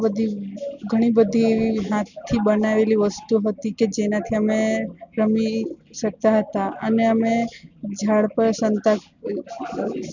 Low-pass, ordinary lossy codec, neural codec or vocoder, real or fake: 7.2 kHz; none; none; real